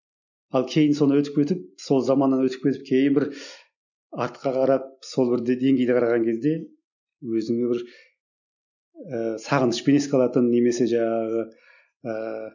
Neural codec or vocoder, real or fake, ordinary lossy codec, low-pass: none; real; none; 7.2 kHz